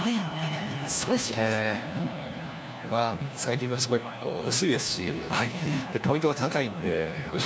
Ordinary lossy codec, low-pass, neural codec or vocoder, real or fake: none; none; codec, 16 kHz, 1 kbps, FunCodec, trained on LibriTTS, 50 frames a second; fake